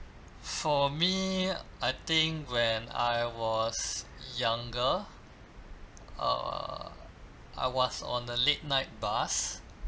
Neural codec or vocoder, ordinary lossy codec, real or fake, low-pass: none; none; real; none